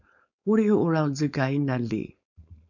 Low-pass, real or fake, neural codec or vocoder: 7.2 kHz; fake; codec, 16 kHz, 4.8 kbps, FACodec